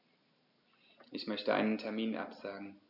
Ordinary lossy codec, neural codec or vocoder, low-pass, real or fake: none; none; 5.4 kHz; real